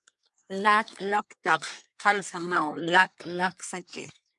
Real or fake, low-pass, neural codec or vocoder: fake; 10.8 kHz; codec, 24 kHz, 1 kbps, SNAC